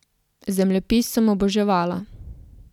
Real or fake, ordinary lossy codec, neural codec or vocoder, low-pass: real; none; none; 19.8 kHz